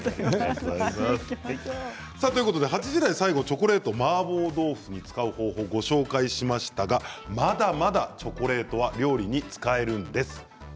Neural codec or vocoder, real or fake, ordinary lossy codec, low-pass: none; real; none; none